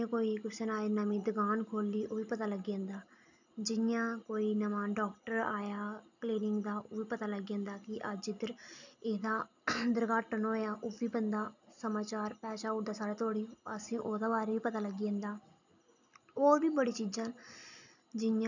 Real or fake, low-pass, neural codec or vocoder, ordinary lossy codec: real; 7.2 kHz; none; none